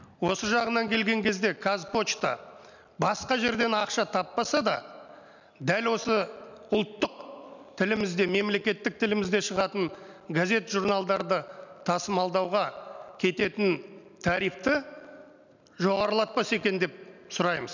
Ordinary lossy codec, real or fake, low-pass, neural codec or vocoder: none; real; 7.2 kHz; none